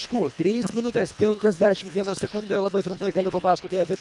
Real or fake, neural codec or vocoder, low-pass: fake; codec, 24 kHz, 1.5 kbps, HILCodec; 10.8 kHz